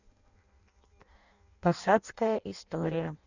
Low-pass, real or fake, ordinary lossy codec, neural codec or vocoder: 7.2 kHz; fake; none; codec, 16 kHz in and 24 kHz out, 0.6 kbps, FireRedTTS-2 codec